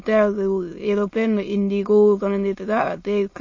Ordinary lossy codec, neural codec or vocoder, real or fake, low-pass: MP3, 32 kbps; autoencoder, 22.05 kHz, a latent of 192 numbers a frame, VITS, trained on many speakers; fake; 7.2 kHz